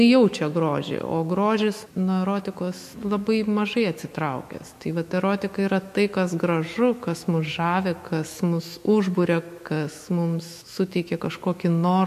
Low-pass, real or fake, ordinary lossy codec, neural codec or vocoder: 14.4 kHz; fake; MP3, 64 kbps; autoencoder, 48 kHz, 128 numbers a frame, DAC-VAE, trained on Japanese speech